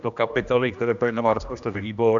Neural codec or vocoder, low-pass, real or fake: codec, 16 kHz, 1 kbps, X-Codec, HuBERT features, trained on general audio; 7.2 kHz; fake